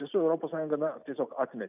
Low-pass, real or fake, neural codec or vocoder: 3.6 kHz; real; none